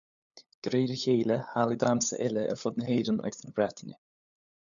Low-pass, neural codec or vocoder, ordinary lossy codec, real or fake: 7.2 kHz; codec, 16 kHz, 8 kbps, FunCodec, trained on LibriTTS, 25 frames a second; MP3, 96 kbps; fake